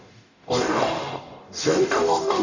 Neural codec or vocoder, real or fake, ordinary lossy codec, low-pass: codec, 44.1 kHz, 0.9 kbps, DAC; fake; none; 7.2 kHz